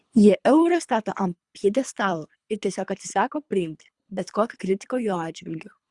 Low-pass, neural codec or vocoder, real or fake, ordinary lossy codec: 10.8 kHz; codec, 24 kHz, 3 kbps, HILCodec; fake; Opus, 64 kbps